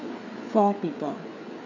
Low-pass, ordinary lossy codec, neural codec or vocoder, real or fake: 7.2 kHz; none; codec, 16 kHz, 4 kbps, FreqCodec, larger model; fake